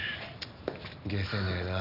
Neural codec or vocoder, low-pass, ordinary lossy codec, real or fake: none; 5.4 kHz; none; real